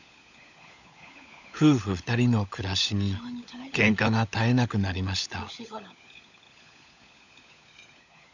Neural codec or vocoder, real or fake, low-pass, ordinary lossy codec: codec, 16 kHz, 16 kbps, FunCodec, trained on LibriTTS, 50 frames a second; fake; 7.2 kHz; none